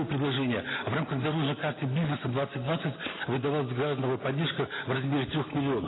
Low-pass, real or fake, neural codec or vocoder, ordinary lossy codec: 7.2 kHz; real; none; AAC, 16 kbps